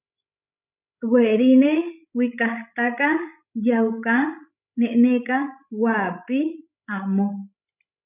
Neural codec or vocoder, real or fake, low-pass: codec, 16 kHz, 16 kbps, FreqCodec, larger model; fake; 3.6 kHz